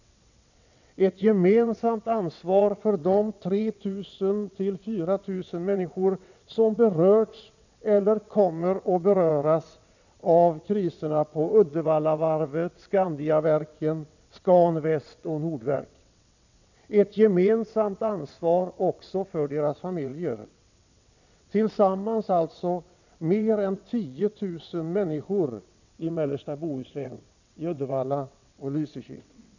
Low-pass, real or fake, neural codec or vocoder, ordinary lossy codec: 7.2 kHz; fake; vocoder, 22.05 kHz, 80 mel bands, WaveNeXt; none